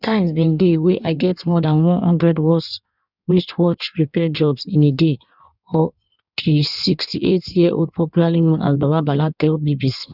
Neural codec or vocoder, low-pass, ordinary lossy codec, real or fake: codec, 16 kHz in and 24 kHz out, 1.1 kbps, FireRedTTS-2 codec; 5.4 kHz; none; fake